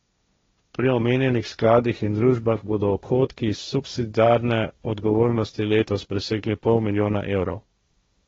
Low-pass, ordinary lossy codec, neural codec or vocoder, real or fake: 7.2 kHz; AAC, 24 kbps; codec, 16 kHz, 1.1 kbps, Voila-Tokenizer; fake